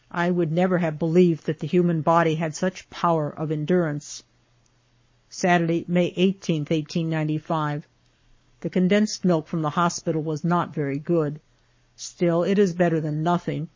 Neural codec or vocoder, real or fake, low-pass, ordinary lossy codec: codec, 44.1 kHz, 7.8 kbps, Pupu-Codec; fake; 7.2 kHz; MP3, 32 kbps